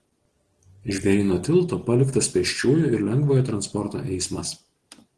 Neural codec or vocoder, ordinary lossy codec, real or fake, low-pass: none; Opus, 16 kbps; real; 10.8 kHz